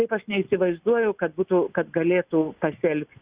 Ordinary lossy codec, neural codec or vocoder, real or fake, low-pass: Opus, 64 kbps; none; real; 3.6 kHz